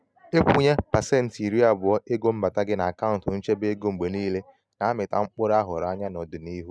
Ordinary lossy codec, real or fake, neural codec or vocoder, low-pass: none; real; none; none